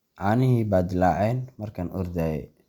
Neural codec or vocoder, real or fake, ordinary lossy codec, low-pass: none; real; Opus, 64 kbps; 19.8 kHz